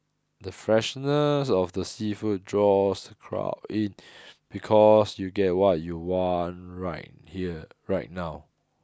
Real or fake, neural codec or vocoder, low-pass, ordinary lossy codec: real; none; none; none